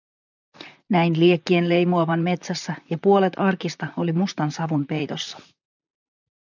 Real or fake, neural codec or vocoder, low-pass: fake; vocoder, 44.1 kHz, 128 mel bands, Pupu-Vocoder; 7.2 kHz